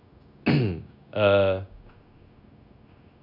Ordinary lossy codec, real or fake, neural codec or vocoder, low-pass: none; fake; codec, 16 kHz, 0.9 kbps, LongCat-Audio-Codec; 5.4 kHz